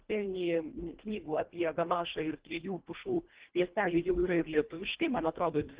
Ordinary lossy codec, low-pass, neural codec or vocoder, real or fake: Opus, 16 kbps; 3.6 kHz; codec, 24 kHz, 1.5 kbps, HILCodec; fake